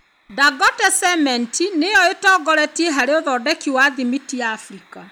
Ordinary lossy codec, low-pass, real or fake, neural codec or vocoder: none; none; real; none